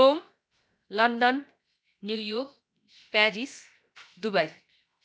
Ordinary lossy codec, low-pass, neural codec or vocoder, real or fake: none; none; codec, 16 kHz, 0.7 kbps, FocalCodec; fake